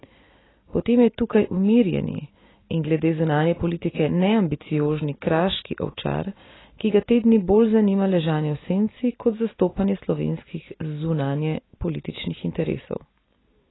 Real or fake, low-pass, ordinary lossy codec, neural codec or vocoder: real; 7.2 kHz; AAC, 16 kbps; none